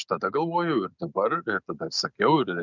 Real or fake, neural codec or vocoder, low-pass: real; none; 7.2 kHz